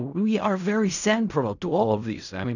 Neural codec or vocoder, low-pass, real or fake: codec, 16 kHz in and 24 kHz out, 0.4 kbps, LongCat-Audio-Codec, fine tuned four codebook decoder; 7.2 kHz; fake